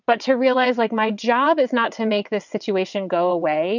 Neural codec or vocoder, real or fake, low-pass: vocoder, 22.05 kHz, 80 mel bands, WaveNeXt; fake; 7.2 kHz